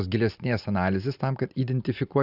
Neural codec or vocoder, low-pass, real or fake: none; 5.4 kHz; real